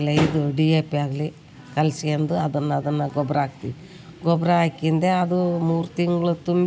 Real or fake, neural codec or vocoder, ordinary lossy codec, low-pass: real; none; none; none